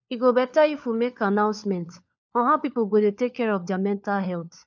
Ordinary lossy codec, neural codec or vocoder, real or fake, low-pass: none; codec, 16 kHz, 4 kbps, FunCodec, trained on LibriTTS, 50 frames a second; fake; 7.2 kHz